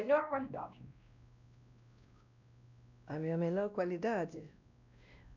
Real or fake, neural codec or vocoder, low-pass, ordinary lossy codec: fake; codec, 16 kHz, 1 kbps, X-Codec, WavLM features, trained on Multilingual LibriSpeech; 7.2 kHz; none